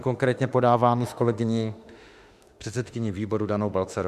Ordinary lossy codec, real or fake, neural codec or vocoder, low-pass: Opus, 64 kbps; fake; autoencoder, 48 kHz, 32 numbers a frame, DAC-VAE, trained on Japanese speech; 14.4 kHz